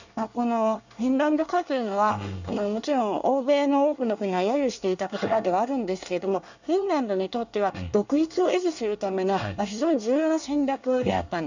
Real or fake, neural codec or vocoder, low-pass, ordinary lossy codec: fake; codec, 24 kHz, 1 kbps, SNAC; 7.2 kHz; none